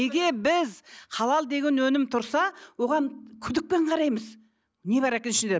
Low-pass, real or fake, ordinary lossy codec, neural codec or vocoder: none; real; none; none